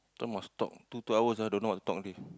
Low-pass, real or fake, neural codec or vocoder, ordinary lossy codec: none; real; none; none